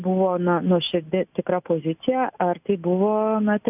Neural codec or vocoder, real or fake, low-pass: none; real; 3.6 kHz